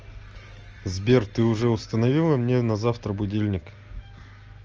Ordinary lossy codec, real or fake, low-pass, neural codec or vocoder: Opus, 24 kbps; real; 7.2 kHz; none